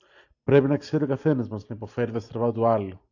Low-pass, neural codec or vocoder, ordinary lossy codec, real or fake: 7.2 kHz; none; AAC, 48 kbps; real